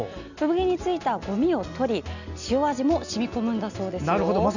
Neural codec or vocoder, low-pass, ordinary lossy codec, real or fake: none; 7.2 kHz; none; real